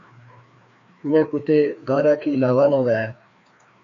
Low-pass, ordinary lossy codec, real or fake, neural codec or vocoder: 7.2 kHz; AAC, 48 kbps; fake; codec, 16 kHz, 2 kbps, FreqCodec, larger model